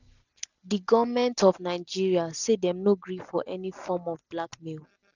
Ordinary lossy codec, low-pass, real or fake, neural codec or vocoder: none; 7.2 kHz; real; none